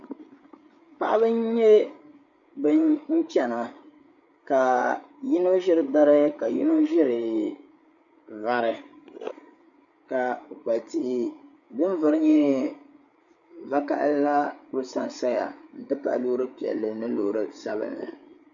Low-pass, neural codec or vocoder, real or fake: 7.2 kHz; codec, 16 kHz, 8 kbps, FreqCodec, larger model; fake